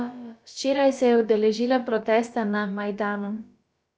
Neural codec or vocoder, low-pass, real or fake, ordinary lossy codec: codec, 16 kHz, about 1 kbps, DyCAST, with the encoder's durations; none; fake; none